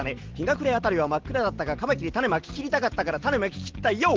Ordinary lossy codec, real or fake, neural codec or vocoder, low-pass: Opus, 16 kbps; real; none; 7.2 kHz